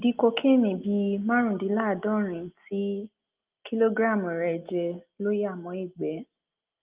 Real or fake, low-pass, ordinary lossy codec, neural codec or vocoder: real; 3.6 kHz; Opus, 32 kbps; none